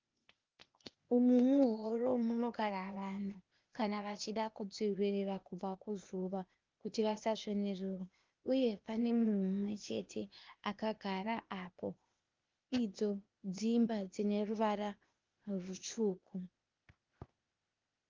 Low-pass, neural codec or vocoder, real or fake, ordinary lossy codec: 7.2 kHz; codec, 16 kHz, 0.8 kbps, ZipCodec; fake; Opus, 24 kbps